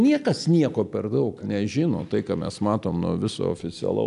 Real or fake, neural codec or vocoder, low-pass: real; none; 10.8 kHz